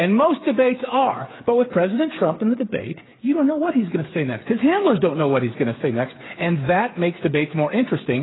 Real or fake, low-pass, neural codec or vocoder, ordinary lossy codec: fake; 7.2 kHz; codec, 16 kHz, 8 kbps, FreqCodec, smaller model; AAC, 16 kbps